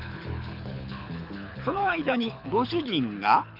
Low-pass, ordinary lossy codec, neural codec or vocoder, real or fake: 5.4 kHz; AAC, 48 kbps; codec, 24 kHz, 6 kbps, HILCodec; fake